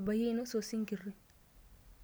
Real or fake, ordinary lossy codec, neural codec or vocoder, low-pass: real; none; none; none